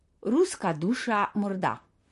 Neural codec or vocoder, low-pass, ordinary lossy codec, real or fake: vocoder, 44.1 kHz, 128 mel bands, Pupu-Vocoder; 14.4 kHz; MP3, 48 kbps; fake